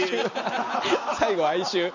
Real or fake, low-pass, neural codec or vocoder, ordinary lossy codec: real; 7.2 kHz; none; Opus, 64 kbps